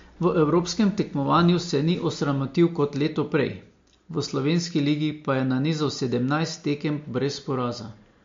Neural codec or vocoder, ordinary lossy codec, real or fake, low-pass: none; MP3, 48 kbps; real; 7.2 kHz